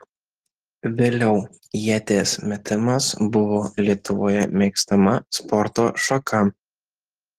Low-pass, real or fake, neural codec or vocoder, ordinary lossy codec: 14.4 kHz; real; none; Opus, 16 kbps